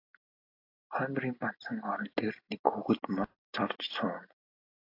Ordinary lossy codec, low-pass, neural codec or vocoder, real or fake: AAC, 32 kbps; 5.4 kHz; none; real